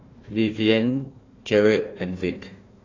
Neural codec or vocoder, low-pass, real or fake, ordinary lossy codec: codec, 16 kHz, 1 kbps, FunCodec, trained on Chinese and English, 50 frames a second; 7.2 kHz; fake; AAC, 32 kbps